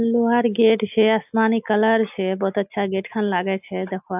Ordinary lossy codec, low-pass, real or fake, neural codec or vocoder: AAC, 32 kbps; 3.6 kHz; real; none